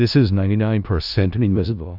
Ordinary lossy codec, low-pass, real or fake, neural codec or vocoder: AAC, 48 kbps; 5.4 kHz; fake; codec, 16 kHz in and 24 kHz out, 0.4 kbps, LongCat-Audio-Codec, four codebook decoder